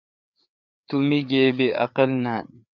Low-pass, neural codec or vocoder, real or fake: 7.2 kHz; codec, 16 kHz, 4 kbps, FreqCodec, larger model; fake